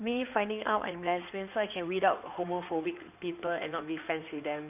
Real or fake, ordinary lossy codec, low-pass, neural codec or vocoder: fake; none; 3.6 kHz; codec, 16 kHz in and 24 kHz out, 2.2 kbps, FireRedTTS-2 codec